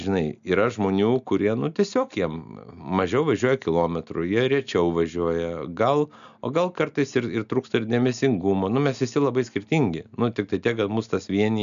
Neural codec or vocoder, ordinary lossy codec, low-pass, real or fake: none; MP3, 96 kbps; 7.2 kHz; real